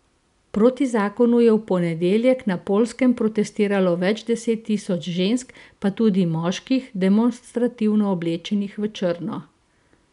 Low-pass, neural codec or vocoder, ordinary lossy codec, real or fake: 10.8 kHz; none; none; real